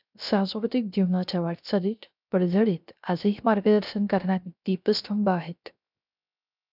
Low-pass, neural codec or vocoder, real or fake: 5.4 kHz; codec, 16 kHz, 0.3 kbps, FocalCodec; fake